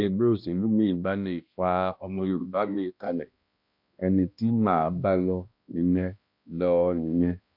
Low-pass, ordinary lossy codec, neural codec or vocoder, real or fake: 5.4 kHz; MP3, 48 kbps; codec, 16 kHz, 1 kbps, X-Codec, HuBERT features, trained on balanced general audio; fake